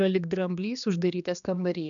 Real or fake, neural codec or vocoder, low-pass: fake; codec, 16 kHz, 2 kbps, X-Codec, HuBERT features, trained on general audio; 7.2 kHz